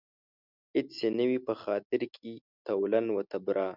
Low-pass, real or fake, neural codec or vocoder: 5.4 kHz; real; none